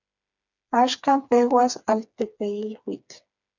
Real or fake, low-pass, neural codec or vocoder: fake; 7.2 kHz; codec, 16 kHz, 4 kbps, FreqCodec, smaller model